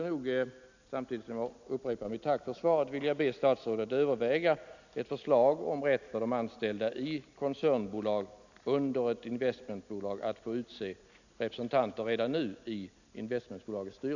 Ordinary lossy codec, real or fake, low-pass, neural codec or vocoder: none; real; 7.2 kHz; none